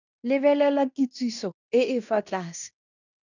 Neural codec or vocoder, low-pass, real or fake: codec, 16 kHz in and 24 kHz out, 0.9 kbps, LongCat-Audio-Codec, fine tuned four codebook decoder; 7.2 kHz; fake